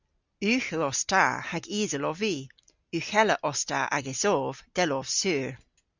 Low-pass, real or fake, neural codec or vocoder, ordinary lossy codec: 7.2 kHz; real; none; Opus, 64 kbps